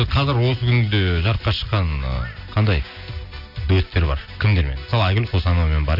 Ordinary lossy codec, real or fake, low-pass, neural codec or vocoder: none; real; 5.4 kHz; none